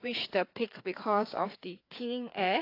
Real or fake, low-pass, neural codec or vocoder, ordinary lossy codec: fake; 5.4 kHz; codec, 16 kHz, 4 kbps, FreqCodec, larger model; AAC, 32 kbps